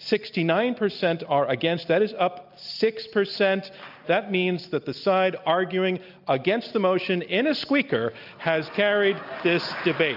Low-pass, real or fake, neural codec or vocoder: 5.4 kHz; real; none